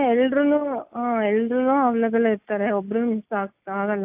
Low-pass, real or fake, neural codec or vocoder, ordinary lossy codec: 3.6 kHz; real; none; AAC, 32 kbps